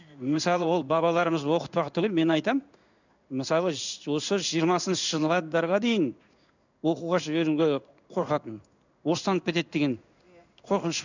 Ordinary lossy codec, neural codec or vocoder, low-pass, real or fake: none; codec, 16 kHz in and 24 kHz out, 1 kbps, XY-Tokenizer; 7.2 kHz; fake